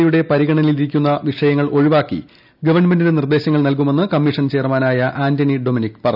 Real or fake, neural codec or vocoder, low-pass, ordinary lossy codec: real; none; 5.4 kHz; none